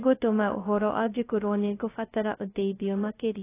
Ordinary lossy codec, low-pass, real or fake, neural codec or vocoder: AAC, 16 kbps; 3.6 kHz; fake; codec, 16 kHz, 0.2 kbps, FocalCodec